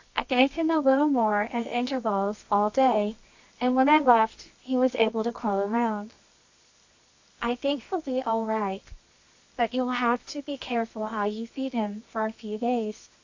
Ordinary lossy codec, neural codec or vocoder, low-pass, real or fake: AAC, 48 kbps; codec, 24 kHz, 0.9 kbps, WavTokenizer, medium music audio release; 7.2 kHz; fake